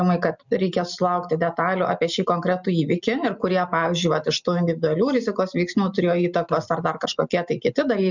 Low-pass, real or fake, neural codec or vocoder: 7.2 kHz; real; none